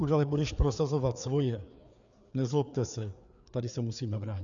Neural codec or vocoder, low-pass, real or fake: codec, 16 kHz, 4 kbps, FreqCodec, larger model; 7.2 kHz; fake